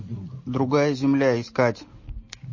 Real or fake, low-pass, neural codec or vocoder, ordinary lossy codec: real; 7.2 kHz; none; MP3, 32 kbps